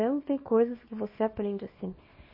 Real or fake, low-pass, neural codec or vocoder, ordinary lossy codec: fake; 5.4 kHz; codec, 24 kHz, 0.9 kbps, WavTokenizer, small release; MP3, 24 kbps